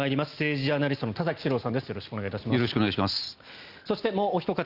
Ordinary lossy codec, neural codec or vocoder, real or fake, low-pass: Opus, 24 kbps; none; real; 5.4 kHz